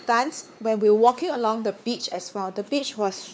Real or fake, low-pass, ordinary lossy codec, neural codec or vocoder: fake; none; none; codec, 16 kHz, 4 kbps, X-Codec, WavLM features, trained on Multilingual LibriSpeech